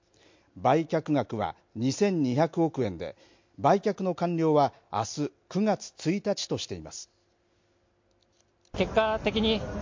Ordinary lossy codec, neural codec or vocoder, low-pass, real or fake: MP3, 48 kbps; none; 7.2 kHz; real